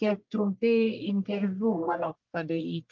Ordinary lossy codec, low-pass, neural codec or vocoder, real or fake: Opus, 24 kbps; 7.2 kHz; codec, 44.1 kHz, 1.7 kbps, Pupu-Codec; fake